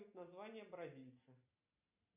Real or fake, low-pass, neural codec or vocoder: real; 3.6 kHz; none